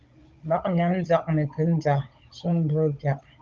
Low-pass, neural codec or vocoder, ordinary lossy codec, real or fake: 7.2 kHz; codec, 16 kHz, 16 kbps, FunCodec, trained on Chinese and English, 50 frames a second; Opus, 24 kbps; fake